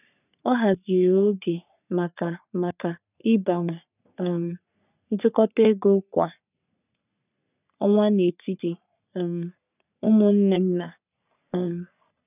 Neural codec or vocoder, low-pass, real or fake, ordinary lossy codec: codec, 44.1 kHz, 3.4 kbps, Pupu-Codec; 3.6 kHz; fake; none